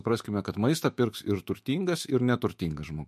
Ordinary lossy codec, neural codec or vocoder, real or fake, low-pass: MP3, 64 kbps; autoencoder, 48 kHz, 128 numbers a frame, DAC-VAE, trained on Japanese speech; fake; 14.4 kHz